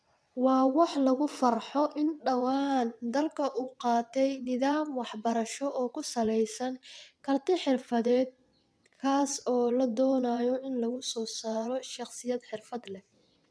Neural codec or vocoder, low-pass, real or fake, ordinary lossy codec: vocoder, 22.05 kHz, 80 mel bands, WaveNeXt; none; fake; none